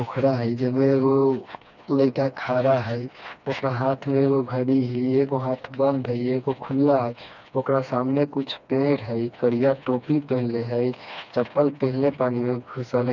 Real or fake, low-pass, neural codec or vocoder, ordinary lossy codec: fake; 7.2 kHz; codec, 16 kHz, 2 kbps, FreqCodec, smaller model; none